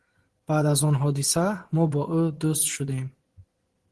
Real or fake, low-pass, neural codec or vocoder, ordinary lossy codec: fake; 10.8 kHz; vocoder, 24 kHz, 100 mel bands, Vocos; Opus, 16 kbps